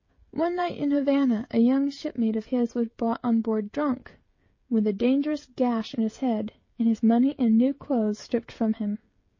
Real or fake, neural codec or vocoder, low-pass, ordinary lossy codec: fake; codec, 16 kHz, 16 kbps, FreqCodec, smaller model; 7.2 kHz; MP3, 32 kbps